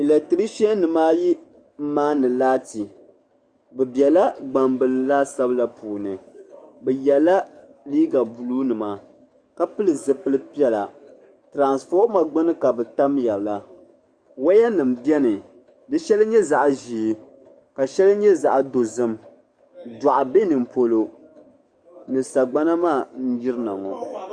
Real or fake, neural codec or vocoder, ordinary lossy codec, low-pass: fake; codec, 44.1 kHz, 7.8 kbps, DAC; Opus, 64 kbps; 9.9 kHz